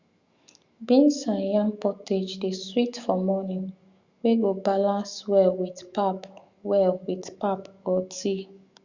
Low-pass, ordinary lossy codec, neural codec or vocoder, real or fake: 7.2 kHz; Opus, 64 kbps; autoencoder, 48 kHz, 128 numbers a frame, DAC-VAE, trained on Japanese speech; fake